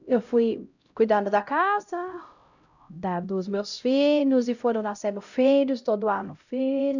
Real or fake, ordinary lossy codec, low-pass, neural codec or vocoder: fake; none; 7.2 kHz; codec, 16 kHz, 0.5 kbps, X-Codec, HuBERT features, trained on LibriSpeech